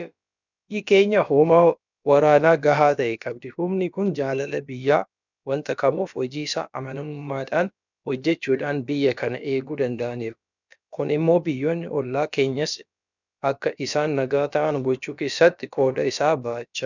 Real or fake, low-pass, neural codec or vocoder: fake; 7.2 kHz; codec, 16 kHz, about 1 kbps, DyCAST, with the encoder's durations